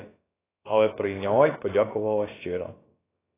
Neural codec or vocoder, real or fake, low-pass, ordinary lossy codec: codec, 16 kHz, about 1 kbps, DyCAST, with the encoder's durations; fake; 3.6 kHz; AAC, 16 kbps